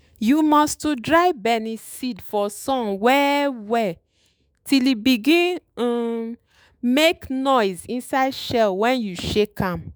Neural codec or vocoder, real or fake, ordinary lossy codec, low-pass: autoencoder, 48 kHz, 128 numbers a frame, DAC-VAE, trained on Japanese speech; fake; none; none